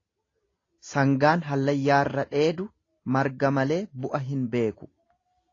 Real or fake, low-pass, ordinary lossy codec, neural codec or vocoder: real; 7.2 kHz; AAC, 32 kbps; none